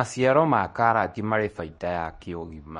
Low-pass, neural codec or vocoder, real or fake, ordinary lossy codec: 10.8 kHz; codec, 24 kHz, 0.9 kbps, WavTokenizer, medium speech release version 2; fake; MP3, 48 kbps